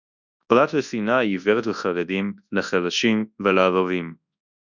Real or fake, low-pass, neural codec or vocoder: fake; 7.2 kHz; codec, 24 kHz, 0.9 kbps, WavTokenizer, large speech release